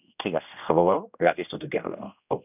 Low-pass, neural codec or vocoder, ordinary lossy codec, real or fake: 3.6 kHz; codec, 16 kHz, 1 kbps, X-Codec, HuBERT features, trained on general audio; none; fake